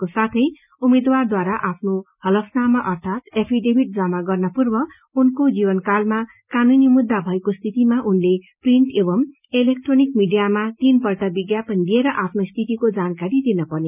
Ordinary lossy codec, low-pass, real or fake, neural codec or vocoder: none; 3.6 kHz; real; none